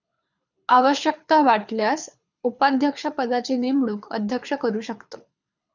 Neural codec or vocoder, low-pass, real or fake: codec, 24 kHz, 6 kbps, HILCodec; 7.2 kHz; fake